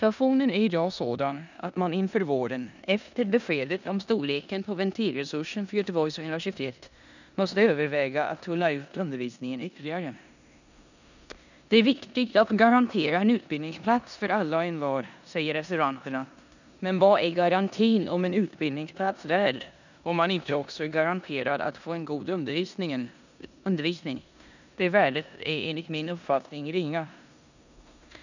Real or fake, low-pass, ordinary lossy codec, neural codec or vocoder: fake; 7.2 kHz; none; codec, 16 kHz in and 24 kHz out, 0.9 kbps, LongCat-Audio-Codec, four codebook decoder